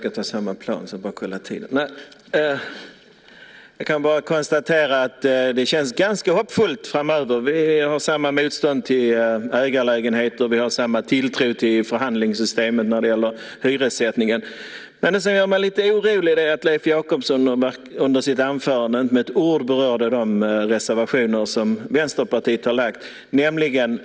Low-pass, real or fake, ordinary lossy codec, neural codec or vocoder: none; real; none; none